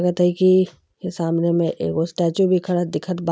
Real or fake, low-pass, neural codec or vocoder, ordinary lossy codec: real; none; none; none